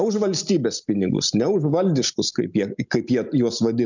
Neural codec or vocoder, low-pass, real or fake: none; 7.2 kHz; real